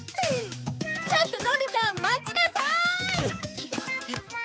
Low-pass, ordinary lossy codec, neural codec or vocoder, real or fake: none; none; codec, 16 kHz, 4 kbps, X-Codec, HuBERT features, trained on balanced general audio; fake